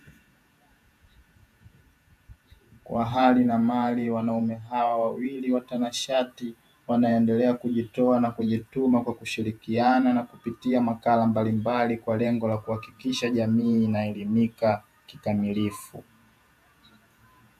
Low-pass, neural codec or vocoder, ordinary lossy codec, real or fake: 14.4 kHz; vocoder, 48 kHz, 128 mel bands, Vocos; AAC, 96 kbps; fake